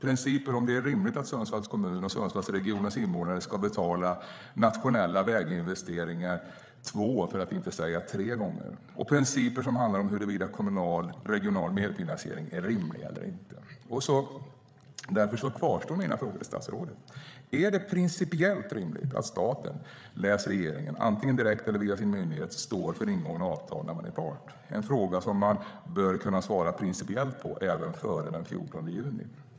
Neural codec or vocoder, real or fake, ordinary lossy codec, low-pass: codec, 16 kHz, 8 kbps, FreqCodec, larger model; fake; none; none